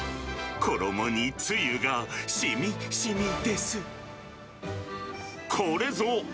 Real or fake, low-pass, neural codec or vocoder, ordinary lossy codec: real; none; none; none